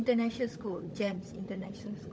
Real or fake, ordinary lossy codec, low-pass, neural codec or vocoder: fake; none; none; codec, 16 kHz, 16 kbps, FunCodec, trained on LibriTTS, 50 frames a second